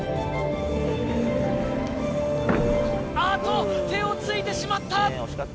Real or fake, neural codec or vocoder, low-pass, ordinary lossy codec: real; none; none; none